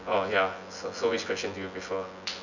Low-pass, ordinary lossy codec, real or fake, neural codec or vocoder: 7.2 kHz; none; fake; vocoder, 24 kHz, 100 mel bands, Vocos